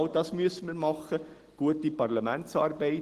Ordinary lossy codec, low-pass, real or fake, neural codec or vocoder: Opus, 16 kbps; 14.4 kHz; real; none